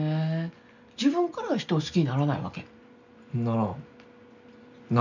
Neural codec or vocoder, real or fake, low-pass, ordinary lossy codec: none; real; 7.2 kHz; none